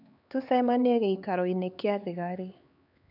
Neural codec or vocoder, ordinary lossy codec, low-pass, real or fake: codec, 16 kHz, 4 kbps, X-Codec, HuBERT features, trained on LibriSpeech; none; 5.4 kHz; fake